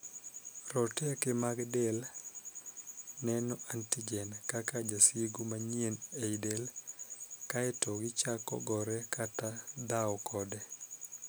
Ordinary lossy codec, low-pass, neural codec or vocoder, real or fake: none; none; none; real